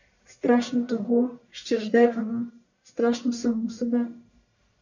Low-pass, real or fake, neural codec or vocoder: 7.2 kHz; fake; codec, 44.1 kHz, 1.7 kbps, Pupu-Codec